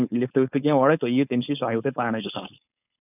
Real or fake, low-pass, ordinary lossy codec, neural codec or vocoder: fake; 3.6 kHz; none; codec, 16 kHz, 4.8 kbps, FACodec